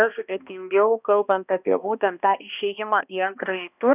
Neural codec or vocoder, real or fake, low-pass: codec, 16 kHz, 1 kbps, X-Codec, HuBERT features, trained on balanced general audio; fake; 3.6 kHz